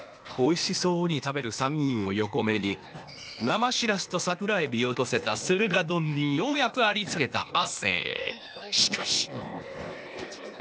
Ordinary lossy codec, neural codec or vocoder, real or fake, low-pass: none; codec, 16 kHz, 0.8 kbps, ZipCodec; fake; none